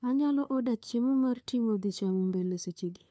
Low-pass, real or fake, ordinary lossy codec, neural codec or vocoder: none; fake; none; codec, 16 kHz, 2 kbps, FunCodec, trained on LibriTTS, 25 frames a second